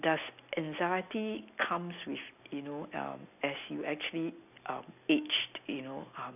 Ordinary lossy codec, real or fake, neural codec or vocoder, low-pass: none; real; none; 3.6 kHz